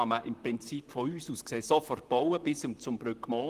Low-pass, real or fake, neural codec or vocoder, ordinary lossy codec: 14.4 kHz; fake; vocoder, 44.1 kHz, 128 mel bands, Pupu-Vocoder; Opus, 24 kbps